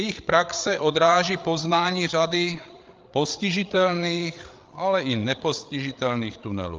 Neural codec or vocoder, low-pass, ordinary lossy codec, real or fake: codec, 16 kHz, 8 kbps, FreqCodec, larger model; 7.2 kHz; Opus, 32 kbps; fake